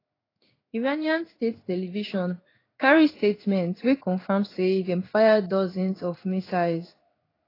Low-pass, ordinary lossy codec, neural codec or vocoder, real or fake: 5.4 kHz; AAC, 24 kbps; codec, 16 kHz in and 24 kHz out, 1 kbps, XY-Tokenizer; fake